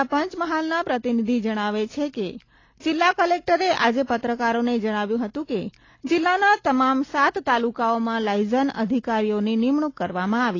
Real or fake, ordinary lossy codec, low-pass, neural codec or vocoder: real; AAC, 32 kbps; 7.2 kHz; none